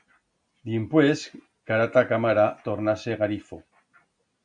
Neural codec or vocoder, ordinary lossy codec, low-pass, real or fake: none; AAC, 64 kbps; 9.9 kHz; real